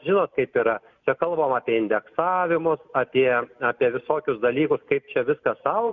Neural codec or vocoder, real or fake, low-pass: none; real; 7.2 kHz